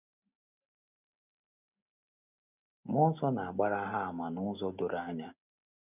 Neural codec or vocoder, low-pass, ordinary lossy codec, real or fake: none; 3.6 kHz; none; real